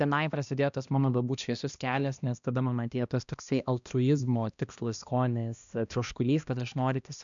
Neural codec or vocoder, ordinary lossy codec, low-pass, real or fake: codec, 16 kHz, 1 kbps, X-Codec, HuBERT features, trained on balanced general audio; MP3, 96 kbps; 7.2 kHz; fake